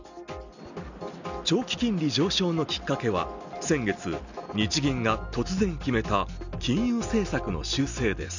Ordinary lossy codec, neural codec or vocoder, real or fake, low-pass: none; none; real; 7.2 kHz